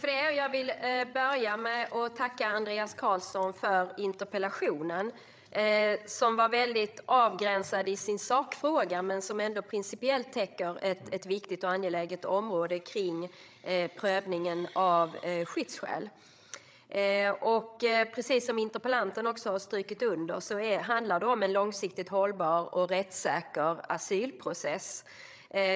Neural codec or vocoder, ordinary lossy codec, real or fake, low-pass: codec, 16 kHz, 8 kbps, FreqCodec, larger model; none; fake; none